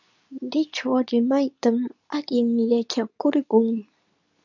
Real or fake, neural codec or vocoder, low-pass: fake; codec, 24 kHz, 0.9 kbps, WavTokenizer, medium speech release version 2; 7.2 kHz